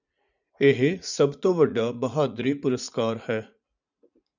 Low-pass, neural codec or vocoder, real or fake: 7.2 kHz; vocoder, 44.1 kHz, 80 mel bands, Vocos; fake